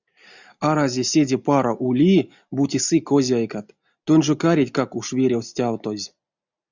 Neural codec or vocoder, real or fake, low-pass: none; real; 7.2 kHz